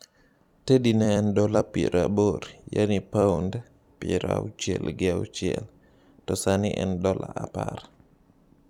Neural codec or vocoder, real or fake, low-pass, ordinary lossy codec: vocoder, 44.1 kHz, 128 mel bands every 256 samples, BigVGAN v2; fake; 19.8 kHz; none